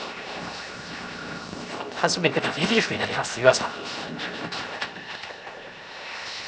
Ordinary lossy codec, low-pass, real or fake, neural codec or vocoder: none; none; fake; codec, 16 kHz, 0.7 kbps, FocalCodec